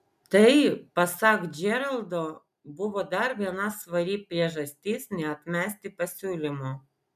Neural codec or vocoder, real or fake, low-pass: none; real; 14.4 kHz